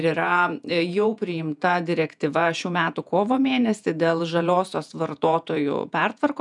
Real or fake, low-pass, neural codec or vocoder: fake; 10.8 kHz; vocoder, 48 kHz, 128 mel bands, Vocos